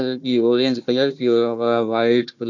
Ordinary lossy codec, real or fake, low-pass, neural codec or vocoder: none; fake; 7.2 kHz; codec, 16 kHz, 1 kbps, FunCodec, trained on Chinese and English, 50 frames a second